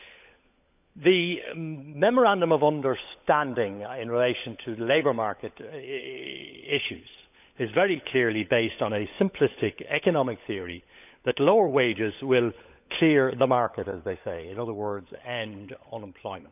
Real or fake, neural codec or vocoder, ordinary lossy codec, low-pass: fake; codec, 16 kHz, 16 kbps, FunCodec, trained on LibriTTS, 50 frames a second; none; 3.6 kHz